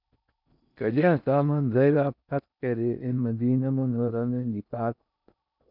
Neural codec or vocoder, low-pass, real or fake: codec, 16 kHz in and 24 kHz out, 0.6 kbps, FocalCodec, streaming, 4096 codes; 5.4 kHz; fake